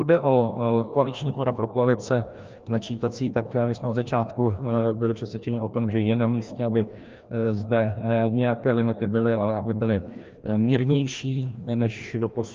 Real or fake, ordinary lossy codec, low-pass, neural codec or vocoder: fake; Opus, 24 kbps; 7.2 kHz; codec, 16 kHz, 1 kbps, FreqCodec, larger model